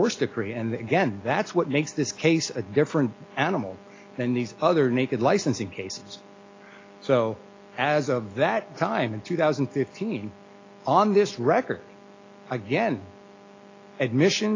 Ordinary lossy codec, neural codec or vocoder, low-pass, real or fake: AAC, 32 kbps; none; 7.2 kHz; real